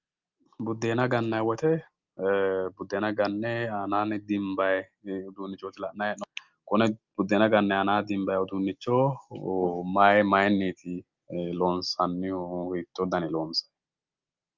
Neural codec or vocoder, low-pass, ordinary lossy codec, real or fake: none; 7.2 kHz; Opus, 32 kbps; real